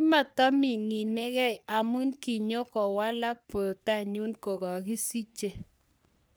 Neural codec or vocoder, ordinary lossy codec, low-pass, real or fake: codec, 44.1 kHz, 3.4 kbps, Pupu-Codec; none; none; fake